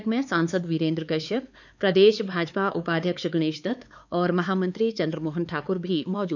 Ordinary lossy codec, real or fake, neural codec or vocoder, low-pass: none; fake; codec, 16 kHz, 4 kbps, X-Codec, HuBERT features, trained on LibriSpeech; 7.2 kHz